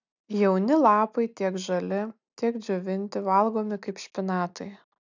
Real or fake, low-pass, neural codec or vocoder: real; 7.2 kHz; none